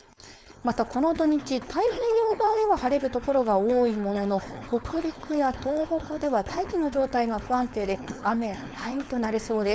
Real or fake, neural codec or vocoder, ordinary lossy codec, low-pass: fake; codec, 16 kHz, 4.8 kbps, FACodec; none; none